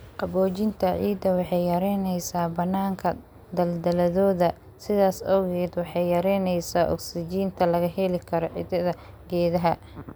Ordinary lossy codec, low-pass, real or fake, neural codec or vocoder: none; none; real; none